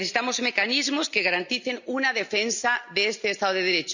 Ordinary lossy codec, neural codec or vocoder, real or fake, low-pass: none; none; real; 7.2 kHz